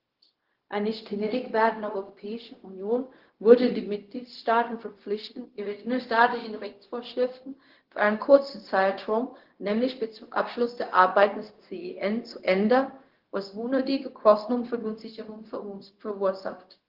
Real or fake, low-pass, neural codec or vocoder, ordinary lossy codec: fake; 5.4 kHz; codec, 16 kHz, 0.4 kbps, LongCat-Audio-Codec; Opus, 16 kbps